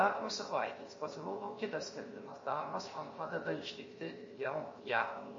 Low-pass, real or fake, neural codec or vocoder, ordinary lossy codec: 7.2 kHz; fake; codec, 16 kHz, 0.7 kbps, FocalCodec; MP3, 32 kbps